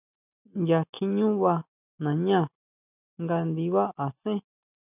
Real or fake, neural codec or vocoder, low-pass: real; none; 3.6 kHz